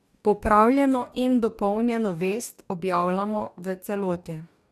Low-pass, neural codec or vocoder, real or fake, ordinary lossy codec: 14.4 kHz; codec, 44.1 kHz, 2.6 kbps, DAC; fake; none